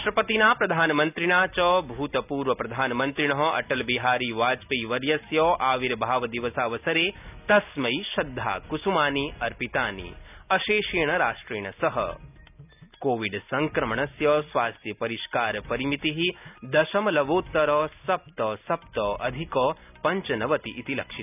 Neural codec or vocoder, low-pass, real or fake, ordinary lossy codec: none; 3.6 kHz; real; none